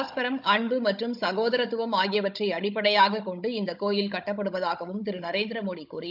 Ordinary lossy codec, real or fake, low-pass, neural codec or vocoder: none; fake; 5.4 kHz; codec, 16 kHz, 16 kbps, FunCodec, trained on Chinese and English, 50 frames a second